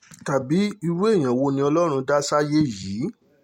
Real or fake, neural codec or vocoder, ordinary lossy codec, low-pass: real; none; MP3, 64 kbps; 19.8 kHz